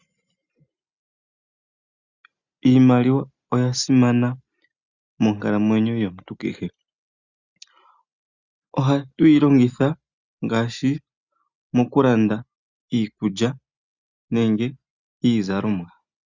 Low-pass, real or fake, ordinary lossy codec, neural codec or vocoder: 7.2 kHz; real; Opus, 64 kbps; none